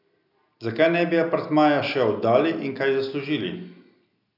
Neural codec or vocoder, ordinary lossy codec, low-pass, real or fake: none; none; 5.4 kHz; real